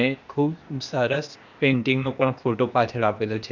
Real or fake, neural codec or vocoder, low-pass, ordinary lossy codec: fake; codec, 16 kHz, 0.8 kbps, ZipCodec; 7.2 kHz; none